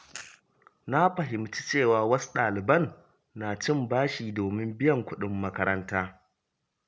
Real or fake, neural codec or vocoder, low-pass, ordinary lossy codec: real; none; none; none